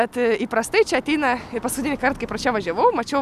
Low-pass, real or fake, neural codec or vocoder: 14.4 kHz; real; none